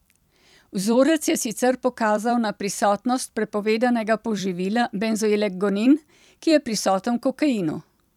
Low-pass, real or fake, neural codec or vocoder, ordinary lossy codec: 19.8 kHz; fake; vocoder, 44.1 kHz, 128 mel bands every 256 samples, BigVGAN v2; none